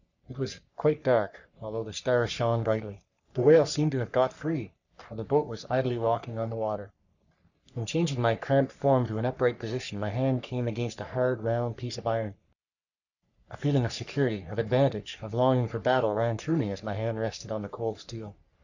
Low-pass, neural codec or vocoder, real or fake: 7.2 kHz; codec, 44.1 kHz, 3.4 kbps, Pupu-Codec; fake